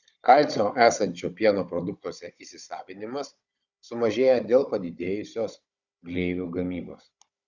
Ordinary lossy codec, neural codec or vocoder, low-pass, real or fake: Opus, 64 kbps; codec, 16 kHz, 16 kbps, FunCodec, trained on Chinese and English, 50 frames a second; 7.2 kHz; fake